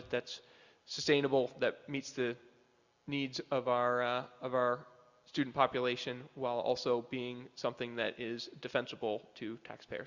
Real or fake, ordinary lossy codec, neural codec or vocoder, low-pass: real; Opus, 64 kbps; none; 7.2 kHz